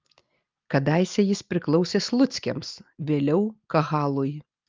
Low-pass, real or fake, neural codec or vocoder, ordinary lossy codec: 7.2 kHz; real; none; Opus, 24 kbps